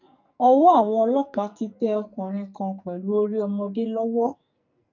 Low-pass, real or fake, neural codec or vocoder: 7.2 kHz; fake; codec, 44.1 kHz, 2.6 kbps, SNAC